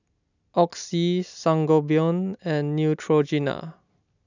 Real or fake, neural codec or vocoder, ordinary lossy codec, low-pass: real; none; none; 7.2 kHz